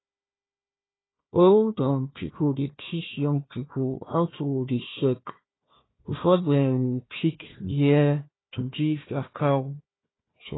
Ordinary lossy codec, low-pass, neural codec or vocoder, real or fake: AAC, 16 kbps; 7.2 kHz; codec, 16 kHz, 1 kbps, FunCodec, trained on Chinese and English, 50 frames a second; fake